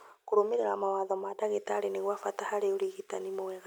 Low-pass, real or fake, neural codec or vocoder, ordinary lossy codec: none; real; none; none